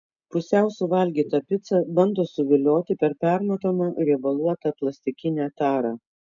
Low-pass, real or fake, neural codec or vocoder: 7.2 kHz; real; none